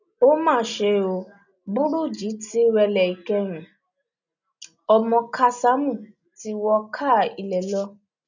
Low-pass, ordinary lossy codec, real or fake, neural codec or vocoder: 7.2 kHz; none; real; none